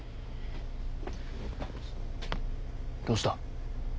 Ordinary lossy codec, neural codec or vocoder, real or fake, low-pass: none; none; real; none